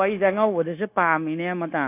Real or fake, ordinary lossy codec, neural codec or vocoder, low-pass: fake; none; codec, 16 kHz, 0.9 kbps, LongCat-Audio-Codec; 3.6 kHz